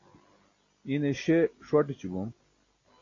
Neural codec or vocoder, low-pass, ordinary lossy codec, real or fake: none; 7.2 kHz; AAC, 32 kbps; real